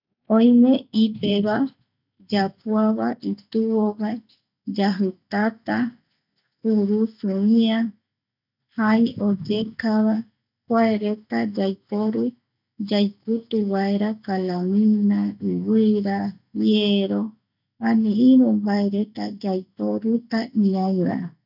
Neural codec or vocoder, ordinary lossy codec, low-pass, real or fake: none; none; 5.4 kHz; real